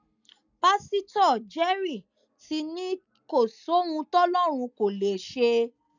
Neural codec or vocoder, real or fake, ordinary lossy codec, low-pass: none; real; none; 7.2 kHz